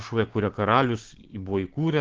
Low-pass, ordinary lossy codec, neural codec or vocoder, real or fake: 7.2 kHz; Opus, 16 kbps; codec, 16 kHz, 6 kbps, DAC; fake